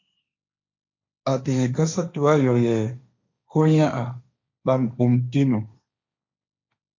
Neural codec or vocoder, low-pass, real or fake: codec, 16 kHz, 1.1 kbps, Voila-Tokenizer; 7.2 kHz; fake